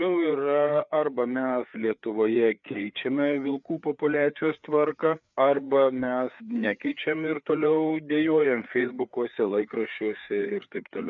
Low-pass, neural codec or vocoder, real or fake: 7.2 kHz; codec, 16 kHz, 4 kbps, FreqCodec, larger model; fake